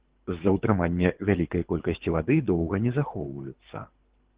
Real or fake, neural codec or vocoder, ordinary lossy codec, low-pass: fake; codec, 24 kHz, 6 kbps, HILCodec; Opus, 16 kbps; 3.6 kHz